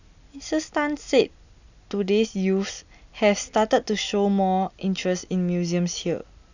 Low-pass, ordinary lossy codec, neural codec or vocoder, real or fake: 7.2 kHz; none; none; real